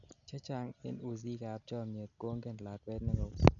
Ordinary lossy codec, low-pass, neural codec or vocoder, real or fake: none; 7.2 kHz; none; real